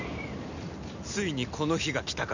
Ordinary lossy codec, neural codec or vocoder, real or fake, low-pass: none; none; real; 7.2 kHz